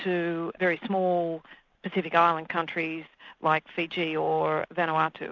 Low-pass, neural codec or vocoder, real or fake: 7.2 kHz; none; real